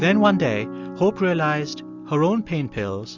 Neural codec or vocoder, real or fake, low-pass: none; real; 7.2 kHz